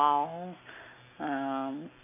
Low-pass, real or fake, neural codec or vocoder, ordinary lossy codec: 3.6 kHz; real; none; none